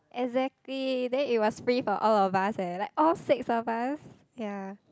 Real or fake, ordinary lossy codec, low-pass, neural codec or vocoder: real; none; none; none